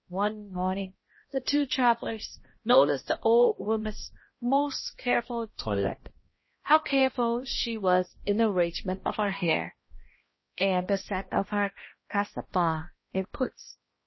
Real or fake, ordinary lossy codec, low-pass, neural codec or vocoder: fake; MP3, 24 kbps; 7.2 kHz; codec, 16 kHz, 1 kbps, X-Codec, HuBERT features, trained on balanced general audio